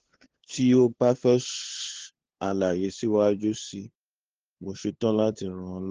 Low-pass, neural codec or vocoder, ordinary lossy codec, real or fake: 7.2 kHz; codec, 16 kHz, 2 kbps, FunCodec, trained on LibriTTS, 25 frames a second; Opus, 16 kbps; fake